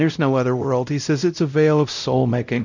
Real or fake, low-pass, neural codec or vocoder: fake; 7.2 kHz; codec, 16 kHz, 0.5 kbps, X-Codec, WavLM features, trained on Multilingual LibriSpeech